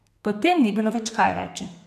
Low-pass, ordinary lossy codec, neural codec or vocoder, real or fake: 14.4 kHz; none; codec, 32 kHz, 1.9 kbps, SNAC; fake